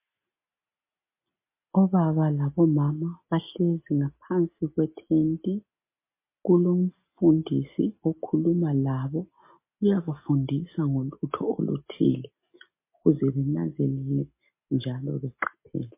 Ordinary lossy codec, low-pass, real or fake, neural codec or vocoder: MP3, 24 kbps; 3.6 kHz; real; none